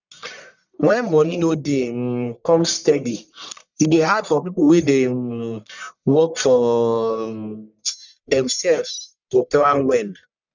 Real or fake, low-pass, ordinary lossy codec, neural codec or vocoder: fake; 7.2 kHz; none; codec, 44.1 kHz, 1.7 kbps, Pupu-Codec